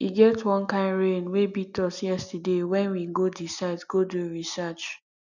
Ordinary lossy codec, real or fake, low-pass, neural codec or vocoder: none; real; 7.2 kHz; none